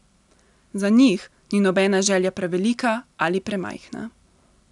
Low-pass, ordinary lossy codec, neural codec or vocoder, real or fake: 10.8 kHz; none; none; real